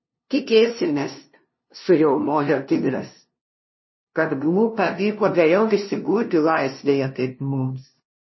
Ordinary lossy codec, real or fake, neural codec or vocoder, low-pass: MP3, 24 kbps; fake; codec, 16 kHz, 0.5 kbps, FunCodec, trained on LibriTTS, 25 frames a second; 7.2 kHz